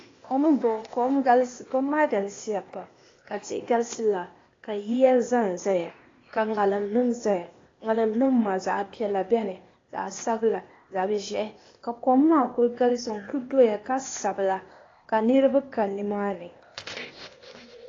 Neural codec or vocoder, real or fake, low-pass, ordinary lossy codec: codec, 16 kHz, 0.8 kbps, ZipCodec; fake; 7.2 kHz; AAC, 32 kbps